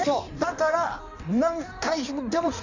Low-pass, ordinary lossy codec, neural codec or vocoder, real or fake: 7.2 kHz; none; codec, 16 kHz in and 24 kHz out, 1.1 kbps, FireRedTTS-2 codec; fake